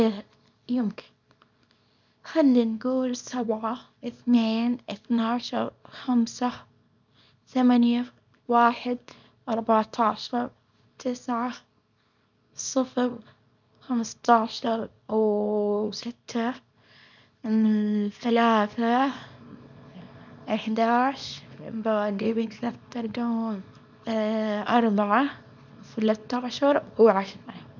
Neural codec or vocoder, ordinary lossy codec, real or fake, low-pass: codec, 24 kHz, 0.9 kbps, WavTokenizer, small release; none; fake; 7.2 kHz